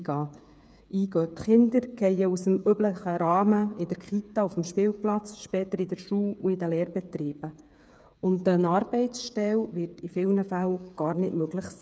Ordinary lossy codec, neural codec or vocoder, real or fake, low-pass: none; codec, 16 kHz, 8 kbps, FreqCodec, smaller model; fake; none